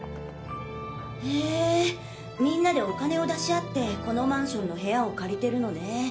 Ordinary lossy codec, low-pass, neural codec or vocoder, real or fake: none; none; none; real